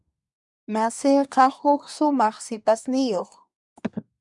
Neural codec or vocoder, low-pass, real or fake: codec, 24 kHz, 1 kbps, SNAC; 10.8 kHz; fake